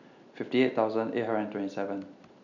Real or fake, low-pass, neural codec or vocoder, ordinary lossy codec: real; 7.2 kHz; none; none